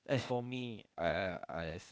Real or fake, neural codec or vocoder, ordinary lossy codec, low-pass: fake; codec, 16 kHz, 0.8 kbps, ZipCodec; none; none